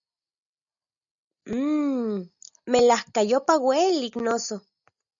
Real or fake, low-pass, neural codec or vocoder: real; 7.2 kHz; none